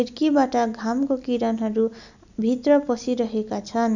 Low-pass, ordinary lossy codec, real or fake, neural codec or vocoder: 7.2 kHz; none; real; none